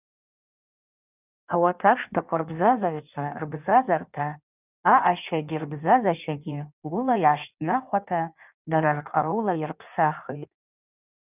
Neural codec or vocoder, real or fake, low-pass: codec, 16 kHz in and 24 kHz out, 1.1 kbps, FireRedTTS-2 codec; fake; 3.6 kHz